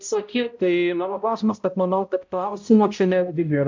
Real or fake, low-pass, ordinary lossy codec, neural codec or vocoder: fake; 7.2 kHz; MP3, 48 kbps; codec, 16 kHz, 0.5 kbps, X-Codec, HuBERT features, trained on balanced general audio